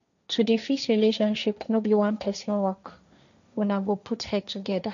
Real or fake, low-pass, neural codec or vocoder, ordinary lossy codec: fake; 7.2 kHz; codec, 16 kHz, 1.1 kbps, Voila-Tokenizer; none